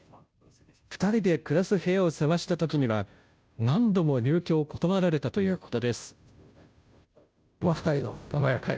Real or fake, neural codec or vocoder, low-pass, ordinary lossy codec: fake; codec, 16 kHz, 0.5 kbps, FunCodec, trained on Chinese and English, 25 frames a second; none; none